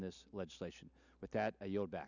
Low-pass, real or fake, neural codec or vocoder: 7.2 kHz; real; none